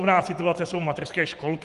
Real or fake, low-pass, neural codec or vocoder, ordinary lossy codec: fake; 10.8 kHz; vocoder, 24 kHz, 100 mel bands, Vocos; Opus, 24 kbps